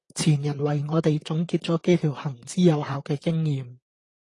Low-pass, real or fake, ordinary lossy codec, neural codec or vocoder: 10.8 kHz; fake; AAC, 32 kbps; vocoder, 44.1 kHz, 128 mel bands, Pupu-Vocoder